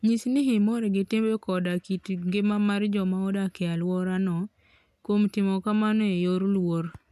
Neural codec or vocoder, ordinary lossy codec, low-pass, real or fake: none; none; 14.4 kHz; real